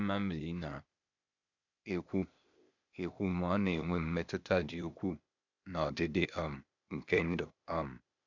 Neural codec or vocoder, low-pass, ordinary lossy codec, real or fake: codec, 16 kHz, 0.8 kbps, ZipCodec; 7.2 kHz; none; fake